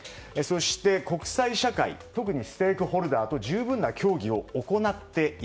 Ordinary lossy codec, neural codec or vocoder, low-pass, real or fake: none; none; none; real